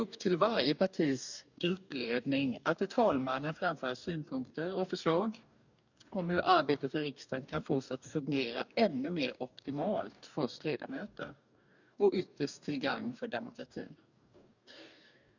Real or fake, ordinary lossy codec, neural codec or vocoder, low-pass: fake; none; codec, 44.1 kHz, 2.6 kbps, DAC; 7.2 kHz